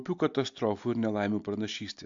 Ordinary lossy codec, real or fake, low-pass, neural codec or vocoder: AAC, 64 kbps; real; 7.2 kHz; none